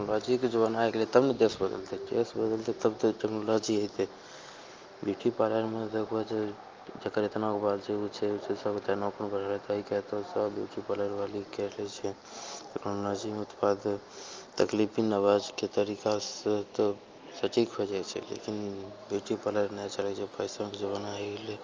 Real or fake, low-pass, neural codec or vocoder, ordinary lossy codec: real; 7.2 kHz; none; Opus, 32 kbps